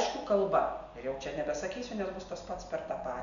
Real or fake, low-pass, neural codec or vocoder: real; 7.2 kHz; none